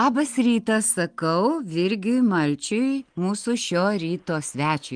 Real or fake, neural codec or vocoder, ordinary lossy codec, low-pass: real; none; Opus, 24 kbps; 9.9 kHz